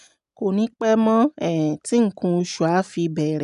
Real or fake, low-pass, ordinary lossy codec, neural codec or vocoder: real; 10.8 kHz; none; none